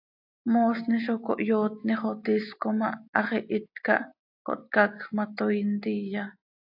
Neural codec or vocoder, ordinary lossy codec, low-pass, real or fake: none; AAC, 48 kbps; 5.4 kHz; real